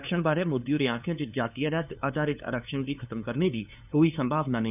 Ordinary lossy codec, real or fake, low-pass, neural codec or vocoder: none; fake; 3.6 kHz; codec, 16 kHz, 2 kbps, FunCodec, trained on LibriTTS, 25 frames a second